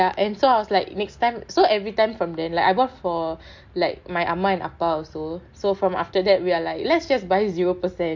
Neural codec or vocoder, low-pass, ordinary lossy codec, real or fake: none; 7.2 kHz; MP3, 48 kbps; real